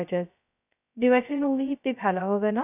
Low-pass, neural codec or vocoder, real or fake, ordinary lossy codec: 3.6 kHz; codec, 16 kHz, 0.2 kbps, FocalCodec; fake; none